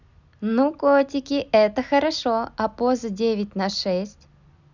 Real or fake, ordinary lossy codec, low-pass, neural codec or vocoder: real; none; 7.2 kHz; none